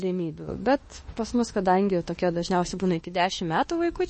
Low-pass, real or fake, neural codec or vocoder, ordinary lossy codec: 10.8 kHz; fake; autoencoder, 48 kHz, 32 numbers a frame, DAC-VAE, trained on Japanese speech; MP3, 32 kbps